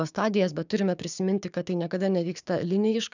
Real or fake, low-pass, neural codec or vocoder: fake; 7.2 kHz; codec, 16 kHz, 6 kbps, DAC